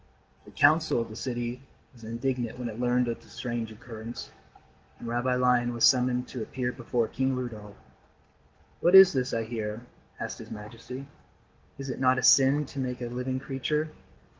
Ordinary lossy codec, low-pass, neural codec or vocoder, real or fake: Opus, 16 kbps; 7.2 kHz; none; real